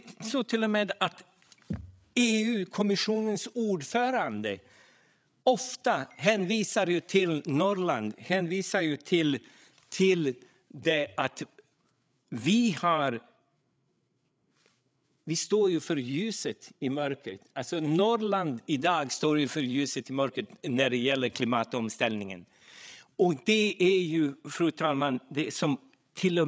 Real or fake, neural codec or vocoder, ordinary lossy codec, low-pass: fake; codec, 16 kHz, 8 kbps, FreqCodec, larger model; none; none